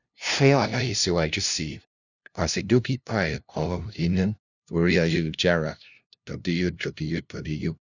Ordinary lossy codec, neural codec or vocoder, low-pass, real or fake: none; codec, 16 kHz, 0.5 kbps, FunCodec, trained on LibriTTS, 25 frames a second; 7.2 kHz; fake